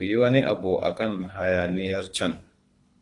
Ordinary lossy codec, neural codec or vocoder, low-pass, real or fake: AAC, 48 kbps; codec, 24 kHz, 3 kbps, HILCodec; 10.8 kHz; fake